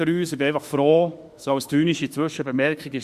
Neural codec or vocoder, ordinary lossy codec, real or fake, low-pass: autoencoder, 48 kHz, 32 numbers a frame, DAC-VAE, trained on Japanese speech; Opus, 64 kbps; fake; 14.4 kHz